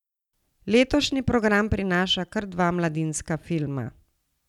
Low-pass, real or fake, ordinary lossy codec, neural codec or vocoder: 19.8 kHz; real; none; none